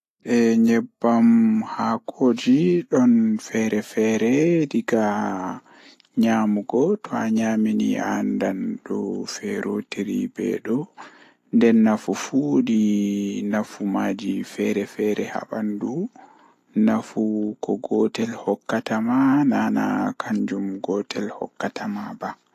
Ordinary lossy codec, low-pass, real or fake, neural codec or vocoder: AAC, 48 kbps; 14.4 kHz; real; none